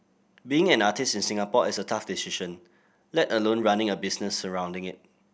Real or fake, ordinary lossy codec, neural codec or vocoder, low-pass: real; none; none; none